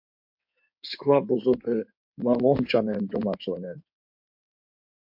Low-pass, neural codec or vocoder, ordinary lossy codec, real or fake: 5.4 kHz; codec, 16 kHz in and 24 kHz out, 2.2 kbps, FireRedTTS-2 codec; MP3, 48 kbps; fake